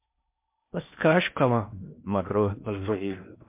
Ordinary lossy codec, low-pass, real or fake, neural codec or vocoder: MP3, 32 kbps; 3.6 kHz; fake; codec, 16 kHz in and 24 kHz out, 0.6 kbps, FocalCodec, streaming, 4096 codes